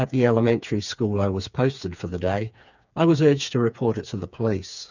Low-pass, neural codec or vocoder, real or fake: 7.2 kHz; codec, 16 kHz, 4 kbps, FreqCodec, smaller model; fake